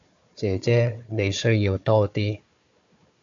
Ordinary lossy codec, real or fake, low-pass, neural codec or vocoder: AAC, 64 kbps; fake; 7.2 kHz; codec, 16 kHz, 4 kbps, FunCodec, trained on Chinese and English, 50 frames a second